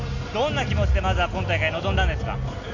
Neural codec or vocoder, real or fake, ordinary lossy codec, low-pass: none; real; none; 7.2 kHz